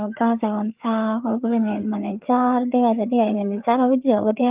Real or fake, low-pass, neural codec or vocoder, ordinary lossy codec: fake; 3.6 kHz; codec, 16 kHz, 4 kbps, FreqCodec, larger model; Opus, 32 kbps